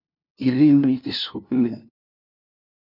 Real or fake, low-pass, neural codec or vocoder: fake; 5.4 kHz; codec, 16 kHz, 0.5 kbps, FunCodec, trained on LibriTTS, 25 frames a second